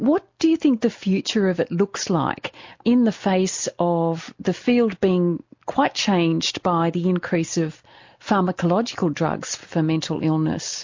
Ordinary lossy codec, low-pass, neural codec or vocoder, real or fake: MP3, 48 kbps; 7.2 kHz; none; real